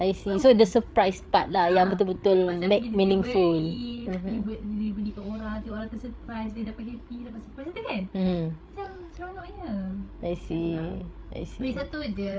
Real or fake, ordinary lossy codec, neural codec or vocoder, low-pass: fake; none; codec, 16 kHz, 8 kbps, FreqCodec, larger model; none